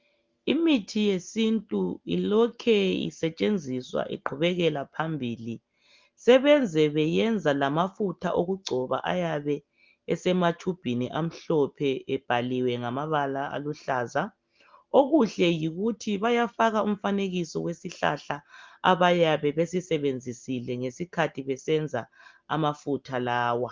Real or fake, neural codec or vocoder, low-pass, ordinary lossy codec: real; none; 7.2 kHz; Opus, 32 kbps